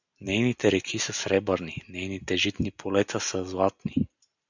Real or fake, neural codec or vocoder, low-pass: real; none; 7.2 kHz